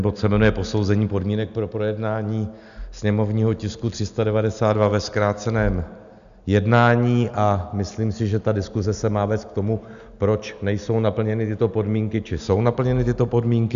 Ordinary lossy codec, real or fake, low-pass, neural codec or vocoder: AAC, 96 kbps; real; 7.2 kHz; none